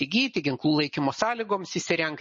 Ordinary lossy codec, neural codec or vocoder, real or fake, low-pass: MP3, 32 kbps; none; real; 10.8 kHz